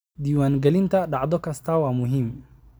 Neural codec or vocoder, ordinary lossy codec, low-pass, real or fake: none; none; none; real